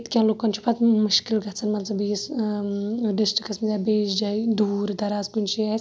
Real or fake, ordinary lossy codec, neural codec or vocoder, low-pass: real; none; none; none